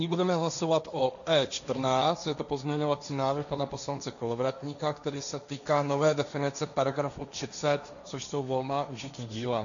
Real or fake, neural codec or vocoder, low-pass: fake; codec, 16 kHz, 1.1 kbps, Voila-Tokenizer; 7.2 kHz